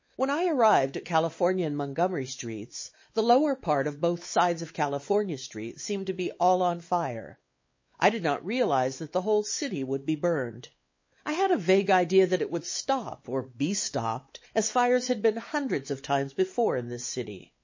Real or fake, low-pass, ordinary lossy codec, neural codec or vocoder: fake; 7.2 kHz; MP3, 32 kbps; codec, 16 kHz, 4 kbps, X-Codec, WavLM features, trained on Multilingual LibriSpeech